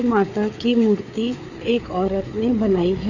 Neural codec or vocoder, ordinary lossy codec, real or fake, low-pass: codec, 16 kHz, 4 kbps, FreqCodec, larger model; none; fake; 7.2 kHz